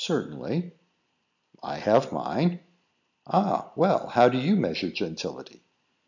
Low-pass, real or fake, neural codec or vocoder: 7.2 kHz; real; none